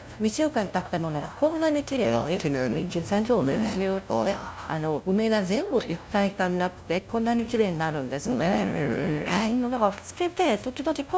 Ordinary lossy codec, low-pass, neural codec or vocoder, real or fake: none; none; codec, 16 kHz, 0.5 kbps, FunCodec, trained on LibriTTS, 25 frames a second; fake